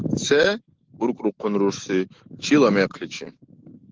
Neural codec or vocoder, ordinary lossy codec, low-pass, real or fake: autoencoder, 48 kHz, 128 numbers a frame, DAC-VAE, trained on Japanese speech; Opus, 16 kbps; 7.2 kHz; fake